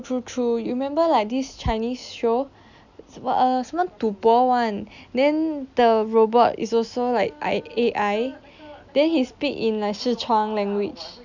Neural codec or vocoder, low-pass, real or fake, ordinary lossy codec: none; 7.2 kHz; real; none